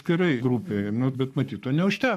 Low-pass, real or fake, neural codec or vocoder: 14.4 kHz; fake; codec, 44.1 kHz, 7.8 kbps, Pupu-Codec